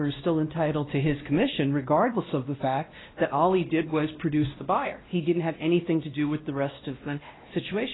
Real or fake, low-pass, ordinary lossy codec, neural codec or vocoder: fake; 7.2 kHz; AAC, 16 kbps; codec, 16 kHz, 1 kbps, X-Codec, WavLM features, trained on Multilingual LibriSpeech